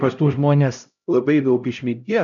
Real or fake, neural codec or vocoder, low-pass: fake; codec, 16 kHz, 0.5 kbps, X-Codec, HuBERT features, trained on LibriSpeech; 7.2 kHz